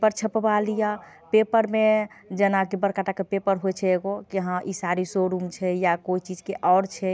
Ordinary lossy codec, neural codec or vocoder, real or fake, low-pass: none; none; real; none